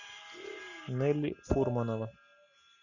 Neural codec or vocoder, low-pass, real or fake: none; 7.2 kHz; real